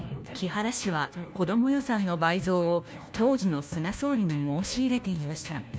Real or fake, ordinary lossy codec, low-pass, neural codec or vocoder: fake; none; none; codec, 16 kHz, 1 kbps, FunCodec, trained on LibriTTS, 50 frames a second